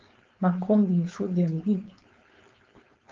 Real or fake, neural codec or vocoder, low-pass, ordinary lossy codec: fake; codec, 16 kHz, 4.8 kbps, FACodec; 7.2 kHz; Opus, 32 kbps